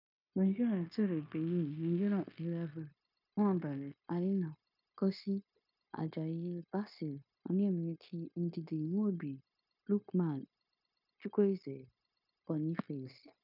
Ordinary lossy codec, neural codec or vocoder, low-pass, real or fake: none; codec, 16 kHz, 0.9 kbps, LongCat-Audio-Codec; 5.4 kHz; fake